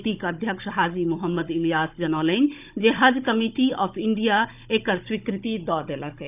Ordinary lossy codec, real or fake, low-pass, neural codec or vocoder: none; fake; 3.6 kHz; codec, 16 kHz, 16 kbps, FunCodec, trained on Chinese and English, 50 frames a second